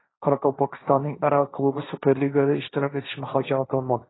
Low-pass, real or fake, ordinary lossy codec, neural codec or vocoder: 7.2 kHz; fake; AAC, 16 kbps; codec, 16 kHz, 1.1 kbps, Voila-Tokenizer